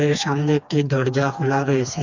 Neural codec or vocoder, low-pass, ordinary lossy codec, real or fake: codec, 16 kHz, 2 kbps, FreqCodec, smaller model; 7.2 kHz; none; fake